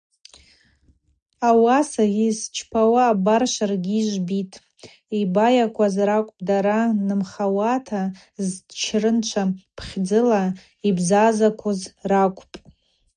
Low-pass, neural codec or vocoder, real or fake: 9.9 kHz; none; real